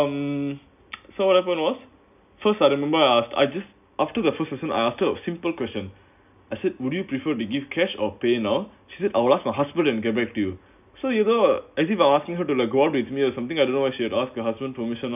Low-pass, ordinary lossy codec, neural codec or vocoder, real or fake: 3.6 kHz; none; none; real